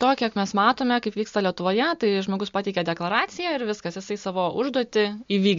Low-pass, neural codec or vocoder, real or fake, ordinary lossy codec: 7.2 kHz; none; real; MP3, 48 kbps